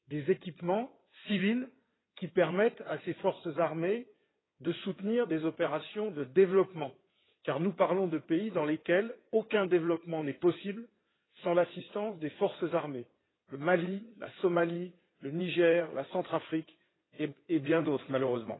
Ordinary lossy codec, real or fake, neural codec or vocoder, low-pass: AAC, 16 kbps; fake; codec, 16 kHz in and 24 kHz out, 2.2 kbps, FireRedTTS-2 codec; 7.2 kHz